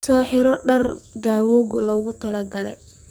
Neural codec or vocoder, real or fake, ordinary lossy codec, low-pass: codec, 44.1 kHz, 2.6 kbps, SNAC; fake; none; none